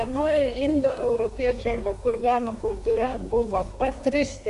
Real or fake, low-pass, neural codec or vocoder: fake; 10.8 kHz; codec, 24 kHz, 1 kbps, SNAC